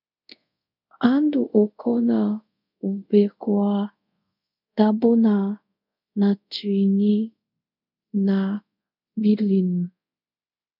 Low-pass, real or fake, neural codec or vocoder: 5.4 kHz; fake; codec, 24 kHz, 0.5 kbps, DualCodec